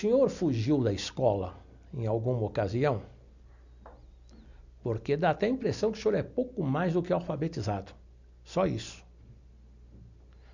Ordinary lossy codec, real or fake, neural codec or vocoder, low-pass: none; real; none; 7.2 kHz